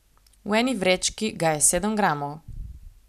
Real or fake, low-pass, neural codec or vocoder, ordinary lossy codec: real; 14.4 kHz; none; none